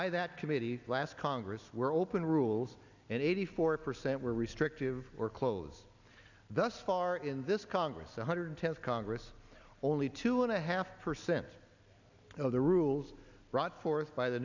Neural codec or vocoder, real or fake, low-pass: none; real; 7.2 kHz